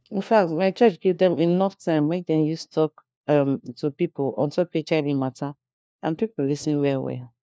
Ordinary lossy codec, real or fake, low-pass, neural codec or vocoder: none; fake; none; codec, 16 kHz, 1 kbps, FunCodec, trained on LibriTTS, 50 frames a second